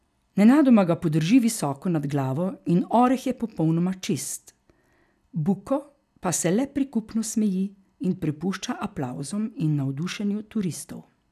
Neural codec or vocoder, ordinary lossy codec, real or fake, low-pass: none; none; real; 14.4 kHz